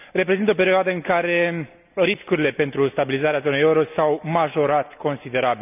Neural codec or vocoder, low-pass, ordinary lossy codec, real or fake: none; 3.6 kHz; none; real